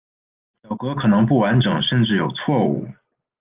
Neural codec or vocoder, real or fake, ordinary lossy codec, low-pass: none; real; Opus, 24 kbps; 3.6 kHz